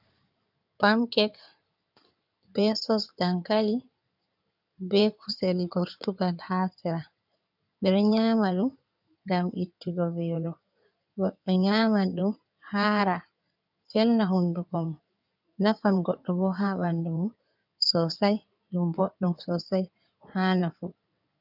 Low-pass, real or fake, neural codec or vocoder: 5.4 kHz; fake; codec, 16 kHz in and 24 kHz out, 2.2 kbps, FireRedTTS-2 codec